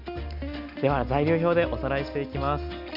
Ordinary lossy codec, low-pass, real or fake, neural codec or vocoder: none; 5.4 kHz; real; none